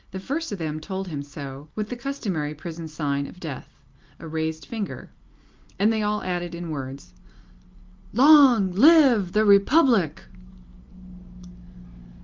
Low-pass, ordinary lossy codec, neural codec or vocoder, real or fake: 7.2 kHz; Opus, 24 kbps; none; real